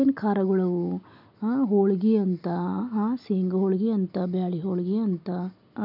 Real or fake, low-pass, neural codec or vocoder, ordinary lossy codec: real; 5.4 kHz; none; none